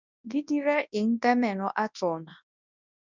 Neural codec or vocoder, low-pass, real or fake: codec, 24 kHz, 0.9 kbps, WavTokenizer, large speech release; 7.2 kHz; fake